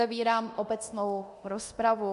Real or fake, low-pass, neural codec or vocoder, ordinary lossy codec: fake; 10.8 kHz; codec, 24 kHz, 0.9 kbps, DualCodec; MP3, 64 kbps